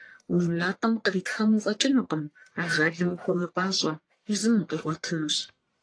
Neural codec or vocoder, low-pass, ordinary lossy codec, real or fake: codec, 44.1 kHz, 1.7 kbps, Pupu-Codec; 9.9 kHz; AAC, 48 kbps; fake